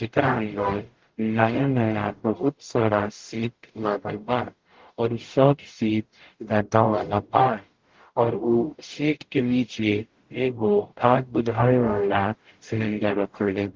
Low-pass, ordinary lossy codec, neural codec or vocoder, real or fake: 7.2 kHz; Opus, 16 kbps; codec, 44.1 kHz, 0.9 kbps, DAC; fake